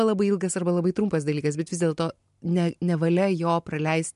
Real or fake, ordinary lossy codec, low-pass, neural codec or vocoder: real; MP3, 64 kbps; 10.8 kHz; none